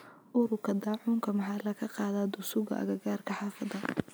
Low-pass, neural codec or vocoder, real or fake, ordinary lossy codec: none; none; real; none